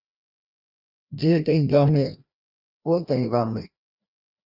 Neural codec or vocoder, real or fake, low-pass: codec, 16 kHz, 1 kbps, FreqCodec, larger model; fake; 5.4 kHz